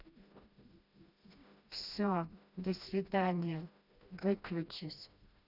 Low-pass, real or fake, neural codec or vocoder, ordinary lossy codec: 5.4 kHz; fake; codec, 16 kHz, 1 kbps, FreqCodec, smaller model; none